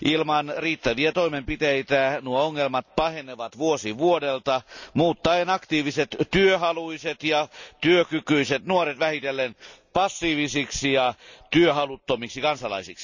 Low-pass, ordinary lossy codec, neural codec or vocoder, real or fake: 7.2 kHz; none; none; real